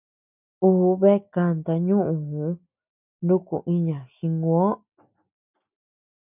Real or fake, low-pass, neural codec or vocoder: real; 3.6 kHz; none